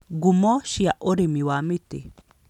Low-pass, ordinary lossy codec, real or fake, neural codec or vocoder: 19.8 kHz; none; real; none